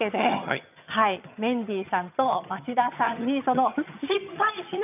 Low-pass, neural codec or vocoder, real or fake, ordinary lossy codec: 3.6 kHz; vocoder, 22.05 kHz, 80 mel bands, HiFi-GAN; fake; none